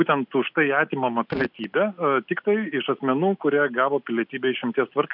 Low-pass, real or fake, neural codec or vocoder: 5.4 kHz; real; none